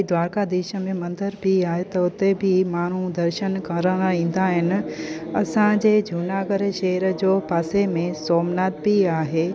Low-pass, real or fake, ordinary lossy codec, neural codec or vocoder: none; real; none; none